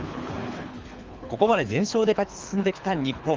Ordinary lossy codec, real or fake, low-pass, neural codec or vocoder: Opus, 32 kbps; fake; 7.2 kHz; codec, 24 kHz, 3 kbps, HILCodec